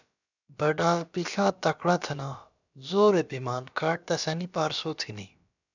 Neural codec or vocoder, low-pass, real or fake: codec, 16 kHz, about 1 kbps, DyCAST, with the encoder's durations; 7.2 kHz; fake